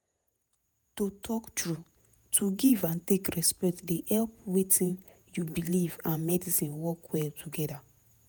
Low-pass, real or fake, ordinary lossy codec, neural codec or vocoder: none; fake; none; vocoder, 48 kHz, 128 mel bands, Vocos